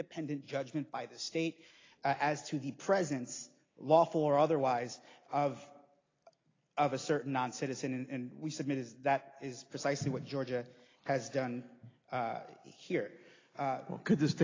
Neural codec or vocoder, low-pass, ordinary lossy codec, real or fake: none; 7.2 kHz; AAC, 32 kbps; real